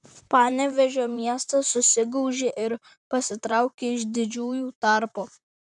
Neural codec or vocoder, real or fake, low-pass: vocoder, 44.1 kHz, 128 mel bands, Pupu-Vocoder; fake; 10.8 kHz